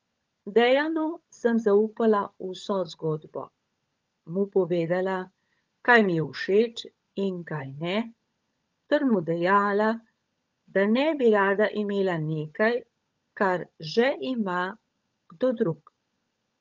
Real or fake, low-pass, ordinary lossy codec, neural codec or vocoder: fake; 7.2 kHz; Opus, 24 kbps; codec, 16 kHz, 16 kbps, FunCodec, trained on LibriTTS, 50 frames a second